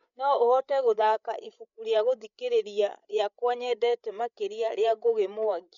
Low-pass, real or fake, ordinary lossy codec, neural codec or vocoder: 7.2 kHz; fake; none; codec, 16 kHz, 8 kbps, FreqCodec, larger model